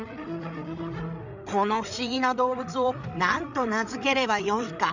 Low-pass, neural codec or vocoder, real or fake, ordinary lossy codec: 7.2 kHz; codec, 16 kHz, 8 kbps, FreqCodec, larger model; fake; none